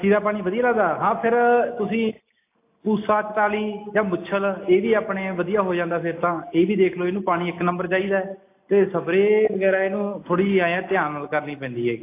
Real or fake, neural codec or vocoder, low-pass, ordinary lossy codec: real; none; 3.6 kHz; AAC, 24 kbps